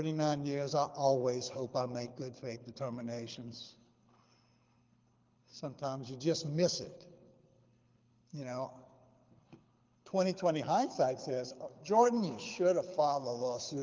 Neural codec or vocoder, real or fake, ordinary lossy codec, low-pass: codec, 24 kHz, 6 kbps, HILCodec; fake; Opus, 24 kbps; 7.2 kHz